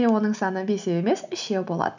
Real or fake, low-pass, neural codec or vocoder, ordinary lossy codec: real; 7.2 kHz; none; none